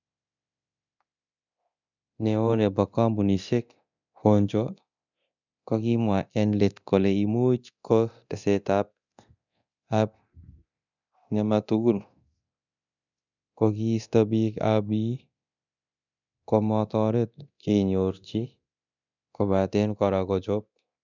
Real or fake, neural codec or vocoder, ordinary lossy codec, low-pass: fake; codec, 24 kHz, 0.9 kbps, DualCodec; none; 7.2 kHz